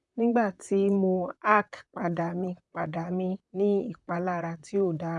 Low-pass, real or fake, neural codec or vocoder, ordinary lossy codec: 10.8 kHz; fake; vocoder, 44.1 kHz, 128 mel bands, Pupu-Vocoder; none